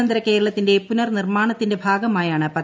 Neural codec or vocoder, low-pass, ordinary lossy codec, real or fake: none; none; none; real